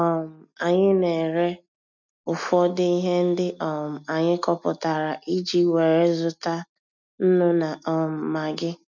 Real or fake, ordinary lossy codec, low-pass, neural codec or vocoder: real; none; 7.2 kHz; none